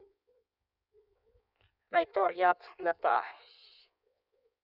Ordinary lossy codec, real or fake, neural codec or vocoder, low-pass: none; fake; codec, 16 kHz in and 24 kHz out, 1.1 kbps, FireRedTTS-2 codec; 5.4 kHz